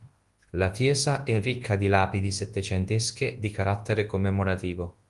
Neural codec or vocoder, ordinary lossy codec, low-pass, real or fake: codec, 24 kHz, 0.9 kbps, WavTokenizer, large speech release; Opus, 24 kbps; 10.8 kHz; fake